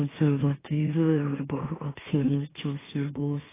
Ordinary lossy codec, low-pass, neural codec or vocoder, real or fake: AAC, 16 kbps; 3.6 kHz; autoencoder, 44.1 kHz, a latent of 192 numbers a frame, MeloTTS; fake